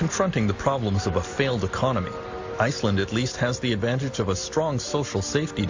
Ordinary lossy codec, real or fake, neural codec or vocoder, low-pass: AAC, 48 kbps; real; none; 7.2 kHz